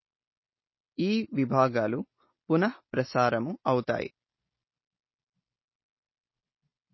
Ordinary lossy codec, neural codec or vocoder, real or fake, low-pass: MP3, 24 kbps; none; real; 7.2 kHz